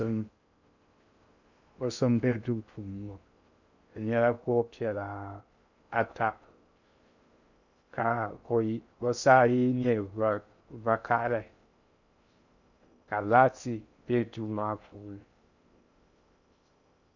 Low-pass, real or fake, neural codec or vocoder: 7.2 kHz; fake; codec, 16 kHz in and 24 kHz out, 0.6 kbps, FocalCodec, streaming, 2048 codes